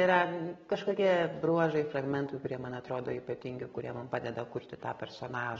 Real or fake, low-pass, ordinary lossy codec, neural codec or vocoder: real; 7.2 kHz; AAC, 24 kbps; none